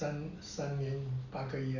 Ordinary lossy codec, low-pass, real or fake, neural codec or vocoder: none; 7.2 kHz; real; none